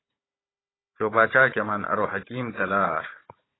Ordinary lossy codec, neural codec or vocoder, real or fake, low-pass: AAC, 16 kbps; codec, 16 kHz, 16 kbps, FunCodec, trained on Chinese and English, 50 frames a second; fake; 7.2 kHz